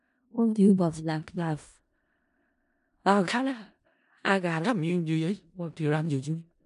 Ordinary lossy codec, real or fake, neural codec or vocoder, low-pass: none; fake; codec, 16 kHz in and 24 kHz out, 0.4 kbps, LongCat-Audio-Codec, four codebook decoder; 10.8 kHz